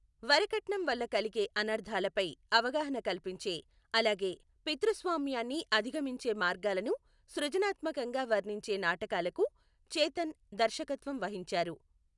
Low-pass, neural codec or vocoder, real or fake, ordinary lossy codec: 10.8 kHz; none; real; none